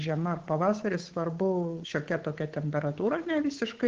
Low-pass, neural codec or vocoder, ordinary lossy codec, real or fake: 7.2 kHz; codec, 16 kHz, 8 kbps, FunCodec, trained on Chinese and English, 25 frames a second; Opus, 16 kbps; fake